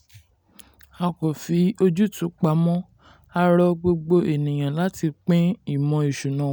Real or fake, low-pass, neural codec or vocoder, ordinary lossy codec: real; none; none; none